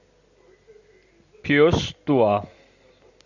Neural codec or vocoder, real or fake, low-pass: none; real; 7.2 kHz